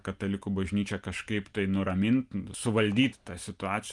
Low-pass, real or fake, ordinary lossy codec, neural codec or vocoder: 10.8 kHz; real; Opus, 32 kbps; none